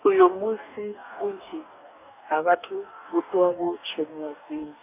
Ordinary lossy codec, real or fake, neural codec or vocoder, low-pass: AAC, 32 kbps; fake; codec, 44.1 kHz, 2.6 kbps, DAC; 3.6 kHz